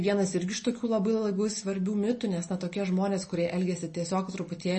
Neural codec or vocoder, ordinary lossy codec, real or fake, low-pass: none; MP3, 32 kbps; real; 10.8 kHz